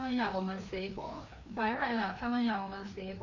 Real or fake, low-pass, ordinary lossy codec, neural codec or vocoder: fake; 7.2 kHz; none; codec, 16 kHz, 2 kbps, FreqCodec, larger model